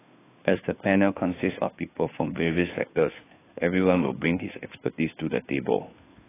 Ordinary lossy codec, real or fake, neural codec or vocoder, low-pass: AAC, 16 kbps; fake; codec, 16 kHz, 2 kbps, FunCodec, trained on Chinese and English, 25 frames a second; 3.6 kHz